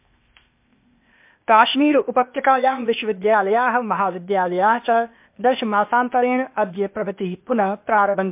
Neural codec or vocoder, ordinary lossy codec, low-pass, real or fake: codec, 16 kHz, 0.8 kbps, ZipCodec; MP3, 32 kbps; 3.6 kHz; fake